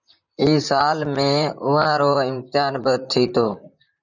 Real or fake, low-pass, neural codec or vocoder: fake; 7.2 kHz; vocoder, 44.1 kHz, 128 mel bands, Pupu-Vocoder